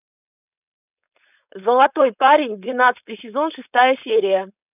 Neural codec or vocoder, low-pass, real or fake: codec, 16 kHz, 4.8 kbps, FACodec; 3.6 kHz; fake